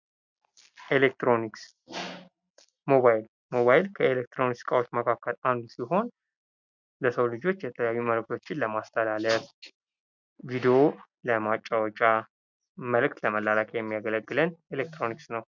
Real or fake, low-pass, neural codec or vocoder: real; 7.2 kHz; none